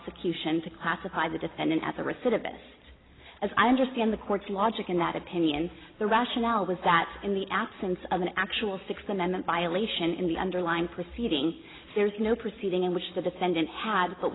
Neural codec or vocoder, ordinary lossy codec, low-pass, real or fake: none; AAC, 16 kbps; 7.2 kHz; real